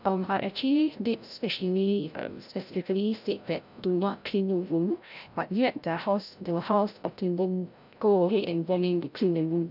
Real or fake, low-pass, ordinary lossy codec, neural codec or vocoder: fake; 5.4 kHz; none; codec, 16 kHz, 0.5 kbps, FreqCodec, larger model